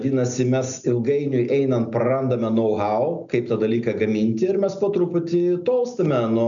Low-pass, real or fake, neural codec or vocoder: 7.2 kHz; real; none